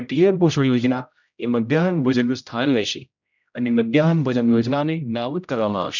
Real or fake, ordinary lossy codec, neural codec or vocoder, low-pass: fake; none; codec, 16 kHz, 0.5 kbps, X-Codec, HuBERT features, trained on general audio; 7.2 kHz